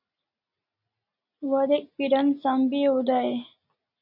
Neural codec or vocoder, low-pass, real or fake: none; 5.4 kHz; real